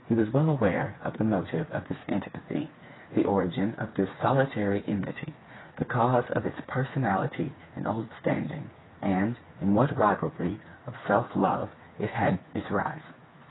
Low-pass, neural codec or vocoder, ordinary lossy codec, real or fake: 7.2 kHz; codec, 16 kHz, 4 kbps, FreqCodec, smaller model; AAC, 16 kbps; fake